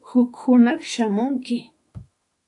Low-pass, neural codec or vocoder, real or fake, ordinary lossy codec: 10.8 kHz; autoencoder, 48 kHz, 32 numbers a frame, DAC-VAE, trained on Japanese speech; fake; AAC, 64 kbps